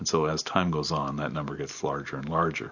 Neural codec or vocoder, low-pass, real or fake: none; 7.2 kHz; real